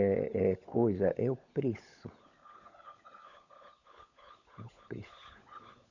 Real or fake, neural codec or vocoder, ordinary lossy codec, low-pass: fake; codec, 16 kHz, 16 kbps, FunCodec, trained on LibriTTS, 50 frames a second; none; 7.2 kHz